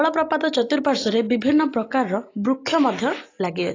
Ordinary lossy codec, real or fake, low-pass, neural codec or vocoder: AAC, 32 kbps; real; 7.2 kHz; none